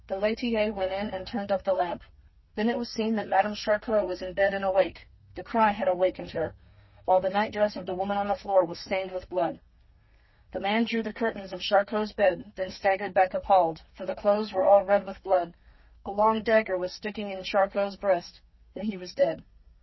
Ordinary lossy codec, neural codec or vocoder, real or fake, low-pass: MP3, 24 kbps; codec, 32 kHz, 1.9 kbps, SNAC; fake; 7.2 kHz